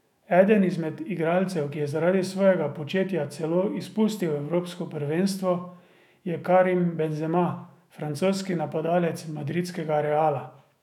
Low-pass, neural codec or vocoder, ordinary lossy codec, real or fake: 19.8 kHz; autoencoder, 48 kHz, 128 numbers a frame, DAC-VAE, trained on Japanese speech; none; fake